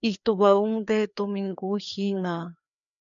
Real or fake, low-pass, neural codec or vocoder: fake; 7.2 kHz; codec, 16 kHz, 2 kbps, FreqCodec, larger model